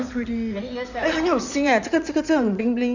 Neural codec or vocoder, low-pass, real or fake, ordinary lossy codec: codec, 16 kHz in and 24 kHz out, 2.2 kbps, FireRedTTS-2 codec; 7.2 kHz; fake; none